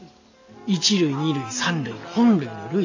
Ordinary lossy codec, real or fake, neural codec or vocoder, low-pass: AAC, 48 kbps; real; none; 7.2 kHz